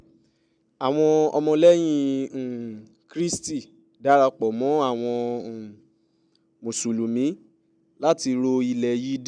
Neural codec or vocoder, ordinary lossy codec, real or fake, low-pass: none; none; real; 9.9 kHz